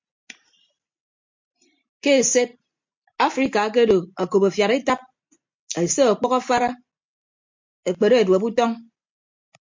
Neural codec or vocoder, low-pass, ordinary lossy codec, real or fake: none; 7.2 kHz; MP3, 48 kbps; real